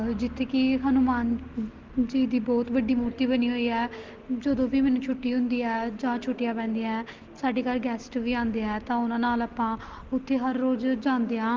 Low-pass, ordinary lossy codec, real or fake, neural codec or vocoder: 7.2 kHz; Opus, 16 kbps; real; none